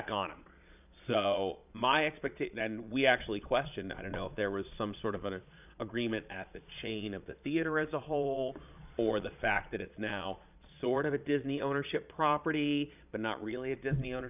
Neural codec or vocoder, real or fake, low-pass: vocoder, 22.05 kHz, 80 mel bands, Vocos; fake; 3.6 kHz